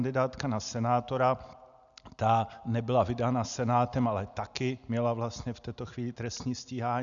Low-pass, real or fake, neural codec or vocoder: 7.2 kHz; real; none